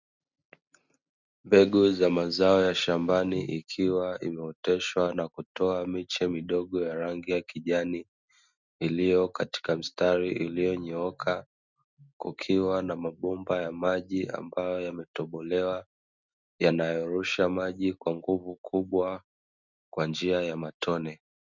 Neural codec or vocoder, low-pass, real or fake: none; 7.2 kHz; real